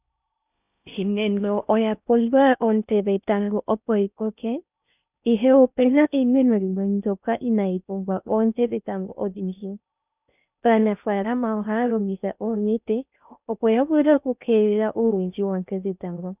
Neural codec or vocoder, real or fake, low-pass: codec, 16 kHz in and 24 kHz out, 0.6 kbps, FocalCodec, streaming, 4096 codes; fake; 3.6 kHz